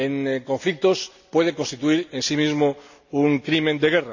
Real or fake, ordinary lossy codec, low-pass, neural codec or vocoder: real; none; 7.2 kHz; none